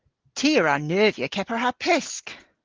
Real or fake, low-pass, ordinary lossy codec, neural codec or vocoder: real; 7.2 kHz; Opus, 16 kbps; none